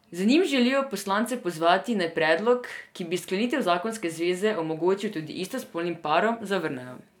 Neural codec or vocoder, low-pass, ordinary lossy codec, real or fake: none; 19.8 kHz; none; real